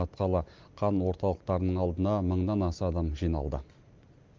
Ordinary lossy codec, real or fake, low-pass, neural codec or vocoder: Opus, 32 kbps; real; 7.2 kHz; none